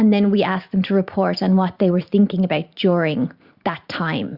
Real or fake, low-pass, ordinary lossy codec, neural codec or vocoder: fake; 5.4 kHz; Opus, 64 kbps; vocoder, 44.1 kHz, 128 mel bands every 512 samples, BigVGAN v2